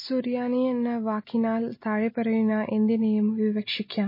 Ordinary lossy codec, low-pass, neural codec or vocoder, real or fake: MP3, 24 kbps; 5.4 kHz; none; real